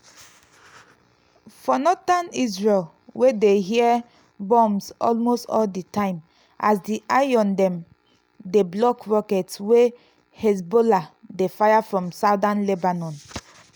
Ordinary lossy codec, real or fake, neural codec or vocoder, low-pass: none; real; none; none